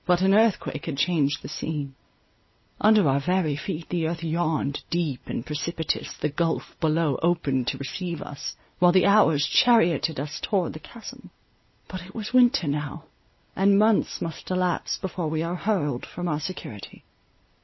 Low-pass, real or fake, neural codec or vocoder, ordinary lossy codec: 7.2 kHz; real; none; MP3, 24 kbps